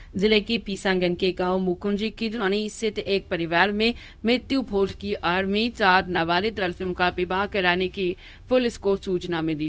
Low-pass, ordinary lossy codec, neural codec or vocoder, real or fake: none; none; codec, 16 kHz, 0.4 kbps, LongCat-Audio-Codec; fake